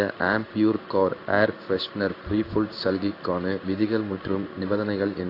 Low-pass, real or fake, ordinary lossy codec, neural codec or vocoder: 5.4 kHz; fake; AAC, 32 kbps; codec, 16 kHz in and 24 kHz out, 1 kbps, XY-Tokenizer